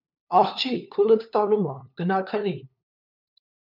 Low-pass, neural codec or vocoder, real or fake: 5.4 kHz; codec, 16 kHz, 2 kbps, FunCodec, trained on LibriTTS, 25 frames a second; fake